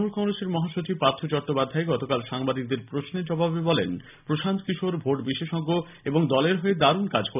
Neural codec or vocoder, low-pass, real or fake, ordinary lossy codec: none; 3.6 kHz; real; none